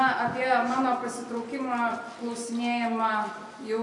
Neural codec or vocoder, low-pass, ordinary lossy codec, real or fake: none; 10.8 kHz; AAC, 48 kbps; real